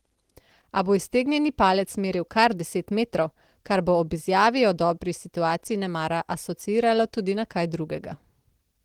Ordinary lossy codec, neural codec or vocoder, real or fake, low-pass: Opus, 16 kbps; none; real; 19.8 kHz